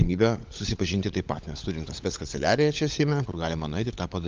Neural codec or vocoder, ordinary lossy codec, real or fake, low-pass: codec, 16 kHz, 16 kbps, FunCodec, trained on LibriTTS, 50 frames a second; Opus, 24 kbps; fake; 7.2 kHz